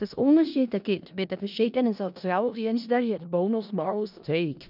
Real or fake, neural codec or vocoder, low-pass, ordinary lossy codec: fake; codec, 16 kHz in and 24 kHz out, 0.4 kbps, LongCat-Audio-Codec, four codebook decoder; 5.4 kHz; none